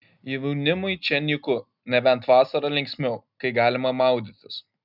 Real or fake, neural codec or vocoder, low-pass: real; none; 5.4 kHz